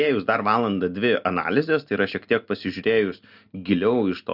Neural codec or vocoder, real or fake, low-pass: none; real; 5.4 kHz